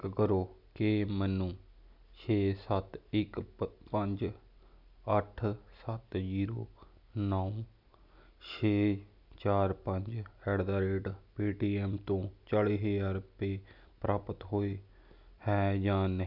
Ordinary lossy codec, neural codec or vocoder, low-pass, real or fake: none; none; 5.4 kHz; real